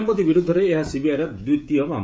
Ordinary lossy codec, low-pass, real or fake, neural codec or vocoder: none; none; fake; codec, 16 kHz, 16 kbps, FreqCodec, smaller model